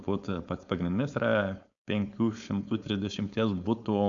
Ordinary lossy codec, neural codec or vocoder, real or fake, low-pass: AAC, 64 kbps; codec, 16 kHz, 4.8 kbps, FACodec; fake; 7.2 kHz